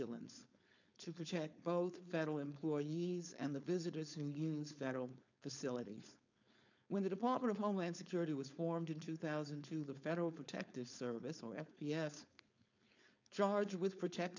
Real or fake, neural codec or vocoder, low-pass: fake; codec, 16 kHz, 4.8 kbps, FACodec; 7.2 kHz